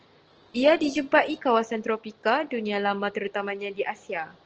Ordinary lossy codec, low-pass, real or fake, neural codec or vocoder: Opus, 16 kbps; 7.2 kHz; real; none